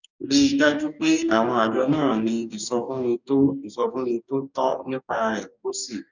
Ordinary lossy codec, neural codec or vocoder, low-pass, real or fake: none; codec, 44.1 kHz, 2.6 kbps, DAC; 7.2 kHz; fake